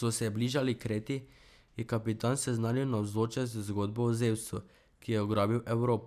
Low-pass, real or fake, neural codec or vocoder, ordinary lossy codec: 14.4 kHz; real; none; none